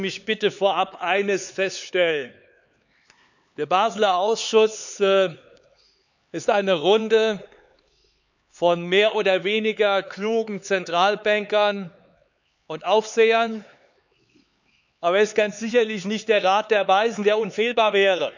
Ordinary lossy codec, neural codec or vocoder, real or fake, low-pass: none; codec, 16 kHz, 4 kbps, X-Codec, HuBERT features, trained on LibriSpeech; fake; 7.2 kHz